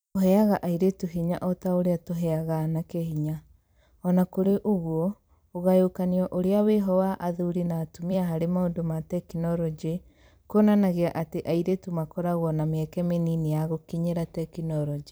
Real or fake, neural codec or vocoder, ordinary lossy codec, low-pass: fake; vocoder, 44.1 kHz, 128 mel bands every 256 samples, BigVGAN v2; none; none